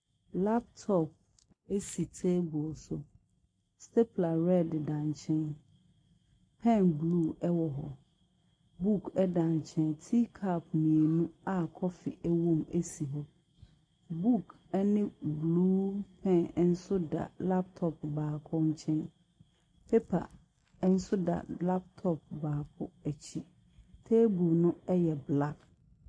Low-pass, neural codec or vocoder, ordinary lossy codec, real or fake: 9.9 kHz; none; MP3, 64 kbps; real